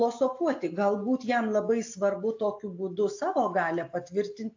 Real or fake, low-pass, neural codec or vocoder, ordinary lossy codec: real; 7.2 kHz; none; AAC, 48 kbps